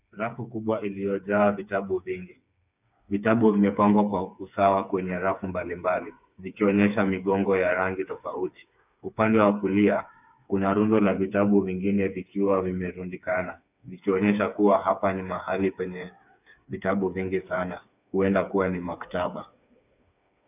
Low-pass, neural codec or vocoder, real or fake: 3.6 kHz; codec, 16 kHz, 4 kbps, FreqCodec, smaller model; fake